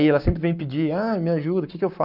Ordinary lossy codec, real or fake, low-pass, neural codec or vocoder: none; fake; 5.4 kHz; codec, 44.1 kHz, 7.8 kbps, Pupu-Codec